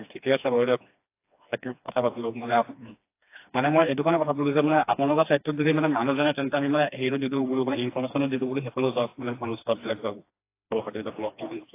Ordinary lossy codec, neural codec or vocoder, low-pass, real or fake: AAC, 24 kbps; codec, 16 kHz, 2 kbps, FreqCodec, smaller model; 3.6 kHz; fake